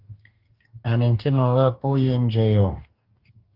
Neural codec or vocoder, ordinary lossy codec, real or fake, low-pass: codec, 44.1 kHz, 2.6 kbps, DAC; Opus, 32 kbps; fake; 5.4 kHz